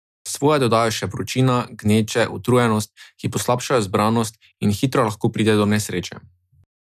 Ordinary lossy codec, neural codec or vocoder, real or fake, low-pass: none; none; real; 14.4 kHz